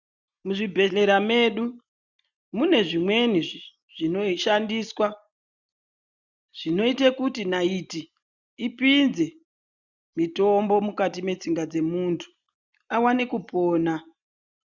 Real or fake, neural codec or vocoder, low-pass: real; none; 7.2 kHz